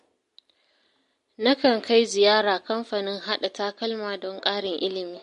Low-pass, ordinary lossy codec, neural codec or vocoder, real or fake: 14.4 kHz; MP3, 48 kbps; none; real